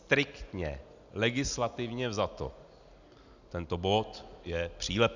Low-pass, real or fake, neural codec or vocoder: 7.2 kHz; real; none